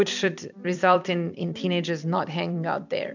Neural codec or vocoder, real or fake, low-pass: vocoder, 44.1 kHz, 128 mel bands every 256 samples, BigVGAN v2; fake; 7.2 kHz